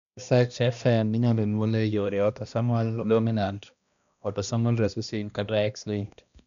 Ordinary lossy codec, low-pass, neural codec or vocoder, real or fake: none; 7.2 kHz; codec, 16 kHz, 1 kbps, X-Codec, HuBERT features, trained on balanced general audio; fake